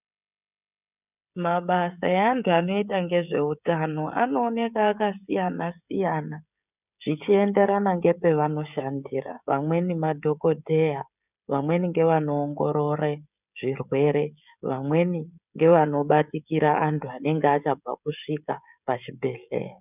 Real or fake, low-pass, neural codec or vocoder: fake; 3.6 kHz; codec, 16 kHz, 16 kbps, FreqCodec, smaller model